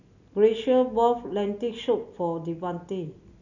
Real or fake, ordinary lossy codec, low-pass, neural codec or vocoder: real; none; 7.2 kHz; none